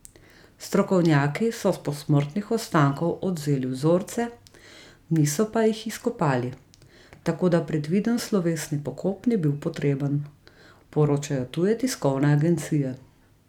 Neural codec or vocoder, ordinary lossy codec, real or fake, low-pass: none; none; real; 19.8 kHz